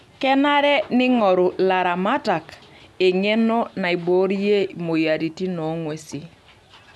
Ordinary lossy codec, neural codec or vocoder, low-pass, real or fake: none; none; none; real